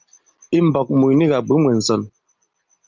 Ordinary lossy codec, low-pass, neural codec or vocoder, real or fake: Opus, 32 kbps; 7.2 kHz; none; real